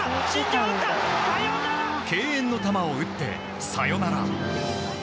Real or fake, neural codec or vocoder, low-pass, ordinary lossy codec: real; none; none; none